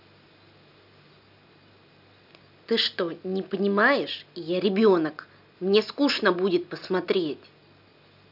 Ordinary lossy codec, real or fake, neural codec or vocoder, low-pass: none; real; none; 5.4 kHz